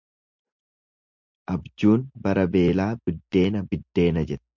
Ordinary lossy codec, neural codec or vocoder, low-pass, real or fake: MP3, 64 kbps; none; 7.2 kHz; real